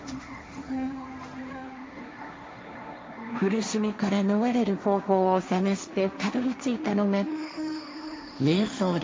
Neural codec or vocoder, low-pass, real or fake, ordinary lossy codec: codec, 16 kHz, 1.1 kbps, Voila-Tokenizer; none; fake; none